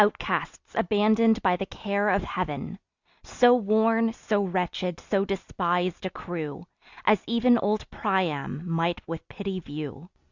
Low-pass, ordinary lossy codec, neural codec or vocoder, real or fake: 7.2 kHz; Opus, 64 kbps; none; real